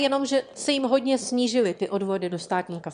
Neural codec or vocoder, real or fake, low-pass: autoencoder, 22.05 kHz, a latent of 192 numbers a frame, VITS, trained on one speaker; fake; 9.9 kHz